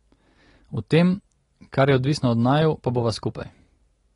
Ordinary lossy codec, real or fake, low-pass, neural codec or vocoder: AAC, 32 kbps; real; 10.8 kHz; none